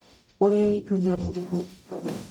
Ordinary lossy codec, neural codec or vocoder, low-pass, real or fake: none; codec, 44.1 kHz, 0.9 kbps, DAC; 19.8 kHz; fake